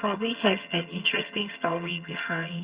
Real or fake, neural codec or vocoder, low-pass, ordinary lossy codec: fake; vocoder, 22.05 kHz, 80 mel bands, HiFi-GAN; 3.6 kHz; Opus, 64 kbps